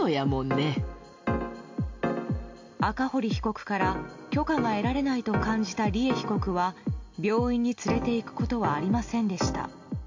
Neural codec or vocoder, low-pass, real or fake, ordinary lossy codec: none; 7.2 kHz; real; MP3, 48 kbps